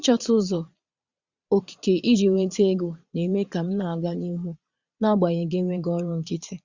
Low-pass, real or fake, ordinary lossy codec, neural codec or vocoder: 7.2 kHz; fake; Opus, 64 kbps; vocoder, 22.05 kHz, 80 mel bands, Vocos